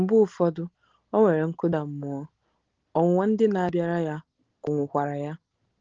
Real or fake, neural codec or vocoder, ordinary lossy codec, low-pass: real; none; Opus, 16 kbps; 7.2 kHz